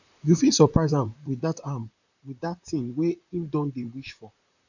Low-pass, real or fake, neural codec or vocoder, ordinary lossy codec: 7.2 kHz; fake; vocoder, 44.1 kHz, 128 mel bands, Pupu-Vocoder; none